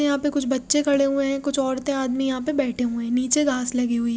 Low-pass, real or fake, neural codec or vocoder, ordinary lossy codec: none; real; none; none